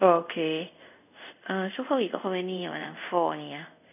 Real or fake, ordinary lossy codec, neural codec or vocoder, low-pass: fake; none; codec, 24 kHz, 0.5 kbps, DualCodec; 3.6 kHz